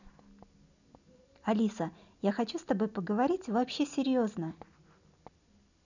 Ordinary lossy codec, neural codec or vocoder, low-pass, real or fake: none; none; 7.2 kHz; real